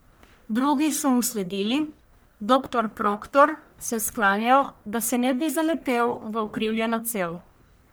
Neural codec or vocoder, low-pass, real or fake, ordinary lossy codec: codec, 44.1 kHz, 1.7 kbps, Pupu-Codec; none; fake; none